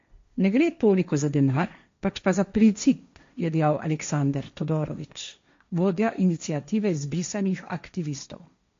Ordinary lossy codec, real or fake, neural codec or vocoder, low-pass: MP3, 48 kbps; fake; codec, 16 kHz, 1.1 kbps, Voila-Tokenizer; 7.2 kHz